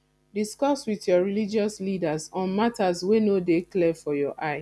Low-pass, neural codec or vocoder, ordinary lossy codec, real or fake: none; none; none; real